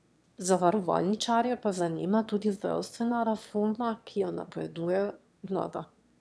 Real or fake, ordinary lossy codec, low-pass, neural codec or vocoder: fake; none; none; autoencoder, 22.05 kHz, a latent of 192 numbers a frame, VITS, trained on one speaker